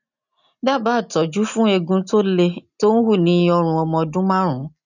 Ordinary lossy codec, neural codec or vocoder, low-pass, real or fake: none; none; 7.2 kHz; real